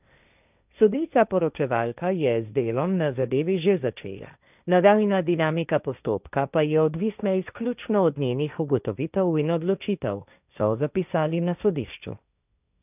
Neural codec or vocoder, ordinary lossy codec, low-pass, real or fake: codec, 16 kHz, 1.1 kbps, Voila-Tokenizer; none; 3.6 kHz; fake